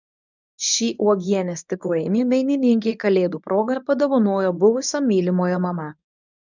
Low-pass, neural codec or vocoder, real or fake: 7.2 kHz; codec, 24 kHz, 0.9 kbps, WavTokenizer, medium speech release version 1; fake